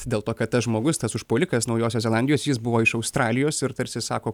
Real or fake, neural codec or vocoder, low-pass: real; none; 19.8 kHz